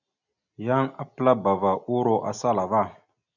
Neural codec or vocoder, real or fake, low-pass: none; real; 7.2 kHz